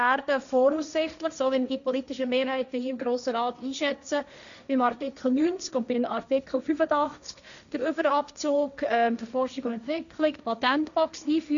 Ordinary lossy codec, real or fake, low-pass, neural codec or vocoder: none; fake; 7.2 kHz; codec, 16 kHz, 1.1 kbps, Voila-Tokenizer